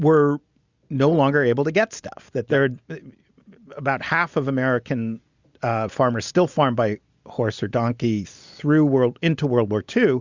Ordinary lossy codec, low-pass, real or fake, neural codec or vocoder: Opus, 64 kbps; 7.2 kHz; real; none